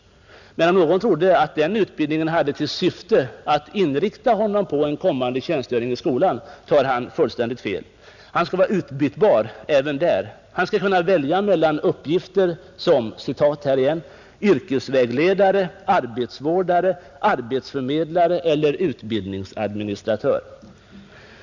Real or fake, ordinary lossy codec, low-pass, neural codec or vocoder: real; none; 7.2 kHz; none